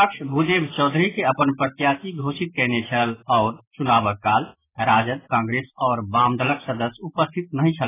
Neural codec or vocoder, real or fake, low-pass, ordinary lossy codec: none; real; 3.6 kHz; AAC, 16 kbps